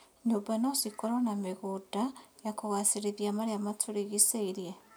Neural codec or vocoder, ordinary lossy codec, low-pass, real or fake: none; none; none; real